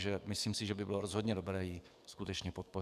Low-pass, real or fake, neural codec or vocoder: 14.4 kHz; fake; codec, 44.1 kHz, 7.8 kbps, DAC